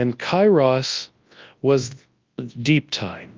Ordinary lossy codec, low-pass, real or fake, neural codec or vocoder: Opus, 24 kbps; 7.2 kHz; fake; codec, 24 kHz, 0.9 kbps, WavTokenizer, large speech release